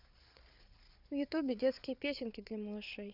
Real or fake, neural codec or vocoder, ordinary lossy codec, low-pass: fake; codec, 16 kHz, 8 kbps, FreqCodec, larger model; none; 5.4 kHz